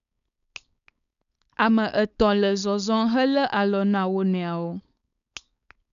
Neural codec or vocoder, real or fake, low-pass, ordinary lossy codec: codec, 16 kHz, 4.8 kbps, FACodec; fake; 7.2 kHz; none